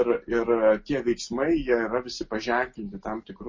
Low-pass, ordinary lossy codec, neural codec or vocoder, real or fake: 7.2 kHz; MP3, 32 kbps; none; real